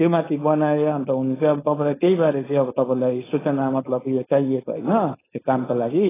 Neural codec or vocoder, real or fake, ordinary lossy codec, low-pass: codec, 16 kHz, 4.8 kbps, FACodec; fake; AAC, 16 kbps; 3.6 kHz